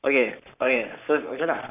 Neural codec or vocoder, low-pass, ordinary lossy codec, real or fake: codec, 44.1 kHz, 7.8 kbps, Pupu-Codec; 3.6 kHz; none; fake